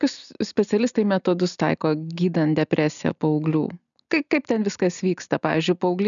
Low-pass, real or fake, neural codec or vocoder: 7.2 kHz; real; none